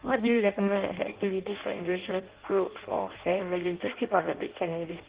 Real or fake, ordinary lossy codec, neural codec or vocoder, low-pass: fake; Opus, 16 kbps; codec, 16 kHz in and 24 kHz out, 0.6 kbps, FireRedTTS-2 codec; 3.6 kHz